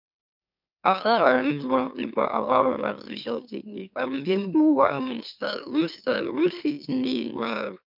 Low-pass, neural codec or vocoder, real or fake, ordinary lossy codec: 5.4 kHz; autoencoder, 44.1 kHz, a latent of 192 numbers a frame, MeloTTS; fake; none